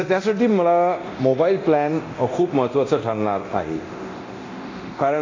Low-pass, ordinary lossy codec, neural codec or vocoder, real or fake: 7.2 kHz; AAC, 32 kbps; codec, 24 kHz, 0.9 kbps, DualCodec; fake